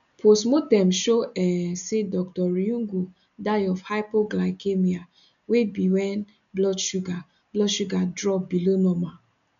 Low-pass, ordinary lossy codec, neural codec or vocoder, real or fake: 7.2 kHz; none; none; real